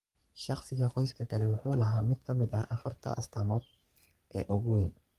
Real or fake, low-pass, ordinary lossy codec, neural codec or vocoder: fake; 14.4 kHz; Opus, 32 kbps; codec, 44.1 kHz, 3.4 kbps, Pupu-Codec